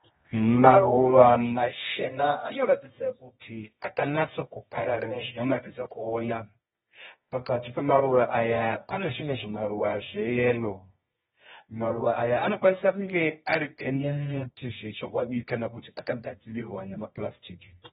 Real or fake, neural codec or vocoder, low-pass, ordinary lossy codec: fake; codec, 24 kHz, 0.9 kbps, WavTokenizer, medium music audio release; 10.8 kHz; AAC, 16 kbps